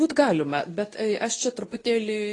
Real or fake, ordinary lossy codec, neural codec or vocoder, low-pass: fake; AAC, 32 kbps; codec, 24 kHz, 0.9 kbps, WavTokenizer, medium speech release version 2; 10.8 kHz